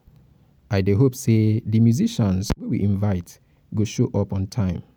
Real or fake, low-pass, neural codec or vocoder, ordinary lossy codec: real; none; none; none